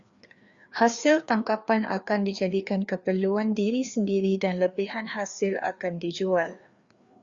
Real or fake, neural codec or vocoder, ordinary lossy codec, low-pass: fake; codec, 16 kHz, 2 kbps, FreqCodec, larger model; Opus, 64 kbps; 7.2 kHz